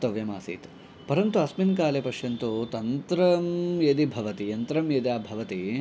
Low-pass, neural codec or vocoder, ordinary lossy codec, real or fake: none; none; none; real